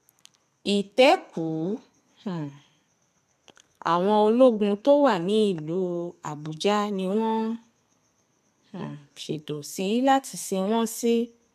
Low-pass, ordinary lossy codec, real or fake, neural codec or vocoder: 14.4 kHz; none; fake; codec, 32 kHz, 1.9 kbps, SNAC